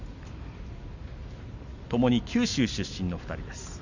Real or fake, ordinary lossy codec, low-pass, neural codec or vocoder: real; none; 7.2 kHz; none